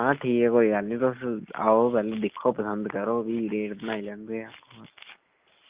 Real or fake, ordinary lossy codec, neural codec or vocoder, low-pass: real; Opus, 24 kbps; none; 3.6 kHz